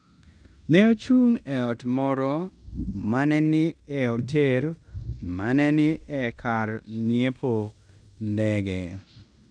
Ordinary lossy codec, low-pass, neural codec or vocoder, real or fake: none; 9.9 kHz; codec, 16 kHz in and 24 kHz out, 0.9 kbps, LongCat-Audio-Codec, fine tuned four codebook decoder; fake